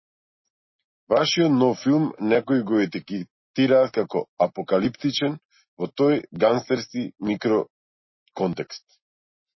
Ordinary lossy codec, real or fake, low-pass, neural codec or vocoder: MP3, 24 kbps; real; 7.2 kHz; none